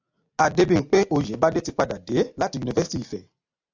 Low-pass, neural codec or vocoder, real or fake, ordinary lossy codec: 7.2 kHz; none; real; AAC, 48 kbps